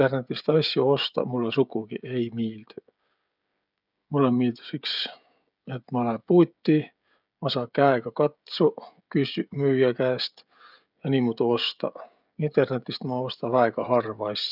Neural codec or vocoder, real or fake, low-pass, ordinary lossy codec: codec, 16 kHz, 16 kbps, FreqCodec, smaller model; fake; 5.4 kHz; none